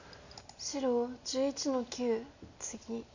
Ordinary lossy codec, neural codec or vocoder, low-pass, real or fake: none; none; 7.2 kHz; real